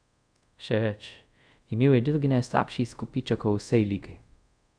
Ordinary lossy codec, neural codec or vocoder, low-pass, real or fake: none; codec, 24 kHz, 0.5 kbps, DualCodec; 9.9 kHz; fake